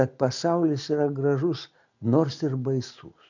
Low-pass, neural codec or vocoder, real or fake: 7.2 kHz; none; real